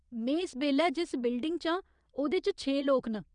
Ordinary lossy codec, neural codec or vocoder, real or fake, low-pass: none; vocoder, 22.05 kHz, 80 mel bands, Vocos; fake; 9.9 kHz